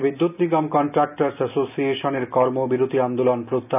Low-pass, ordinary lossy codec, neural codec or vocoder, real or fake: 3.6 kHz; none; none; real